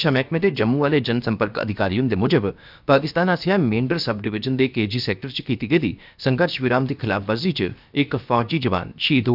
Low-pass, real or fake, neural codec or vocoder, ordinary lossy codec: 5.4 kHz; fake; codec, 16 kHz, about 1 kbps, DyCAST, with the encoder's durations; none